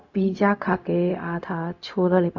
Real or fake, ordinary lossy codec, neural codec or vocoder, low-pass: fake; none; codec, 16 kHz, 0.4 kbps, LongCat-Audio-Codec; 7.2 kHz